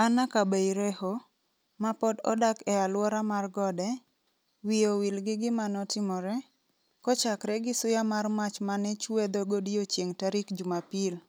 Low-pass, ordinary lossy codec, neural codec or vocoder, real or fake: none; none; none; real